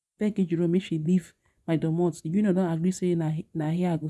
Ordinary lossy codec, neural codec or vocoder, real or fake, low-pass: none; vocoder, 24 kHz, 100 mel bands, Vocos; fake; none